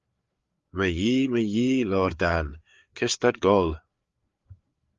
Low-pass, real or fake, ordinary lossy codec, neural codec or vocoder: 7.2 kHz; fake; Opus, 32 kbps; codec, 16 kHz, 4 kbps, FreqCodec, larger model